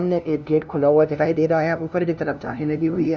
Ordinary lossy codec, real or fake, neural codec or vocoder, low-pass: none; fake; codec, 16 kHz, 0.5 kbps, FunCodec, trained on LibriTTS, 25 frames a second; none